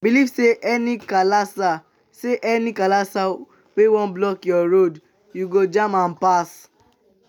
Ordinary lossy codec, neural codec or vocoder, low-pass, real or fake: none; none; 19.8 kHz; real